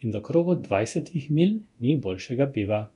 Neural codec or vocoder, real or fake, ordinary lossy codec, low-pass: codec, 24 kHz, 0.9 kbps, DualCodec; fake; none; 10.8 kHz